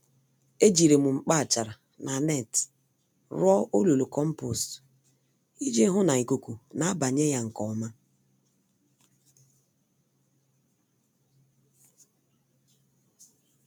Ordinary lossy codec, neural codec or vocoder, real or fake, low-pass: none; none; real; none